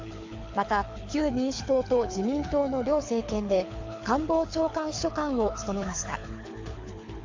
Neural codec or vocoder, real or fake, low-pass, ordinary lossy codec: codec, 24 kHz, 6 kbps, HILCodec; fake; 7.2 kHz; AAC, 48 kbps